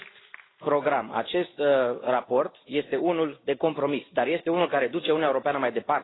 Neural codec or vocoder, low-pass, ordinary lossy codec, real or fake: none; 7.2 kHz; AAC, 16 kbps; real